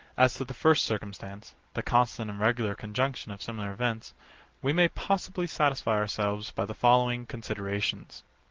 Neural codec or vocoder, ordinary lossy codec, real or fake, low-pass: none; Opus, 16 kbps; real; 7.2 kHz